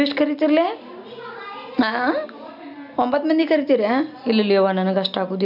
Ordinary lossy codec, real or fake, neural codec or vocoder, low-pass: none; real; none; 5.4 kHz